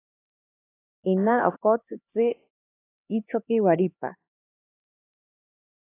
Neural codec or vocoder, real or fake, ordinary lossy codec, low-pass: codec, 16 kHz, 4 kbps, X-Codec, HuBERT features, trained on LibriSpeech; fake; AAC, 24 kbps; 3.6 kHz